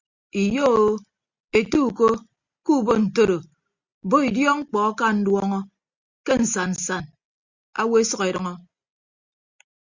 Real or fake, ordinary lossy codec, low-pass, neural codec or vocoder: real; Opus, 64 kbps; 7.2 kHz; none